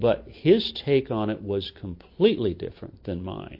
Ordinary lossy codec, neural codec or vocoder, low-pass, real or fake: MP3, 32 kbps; none; 5.4 kHz; real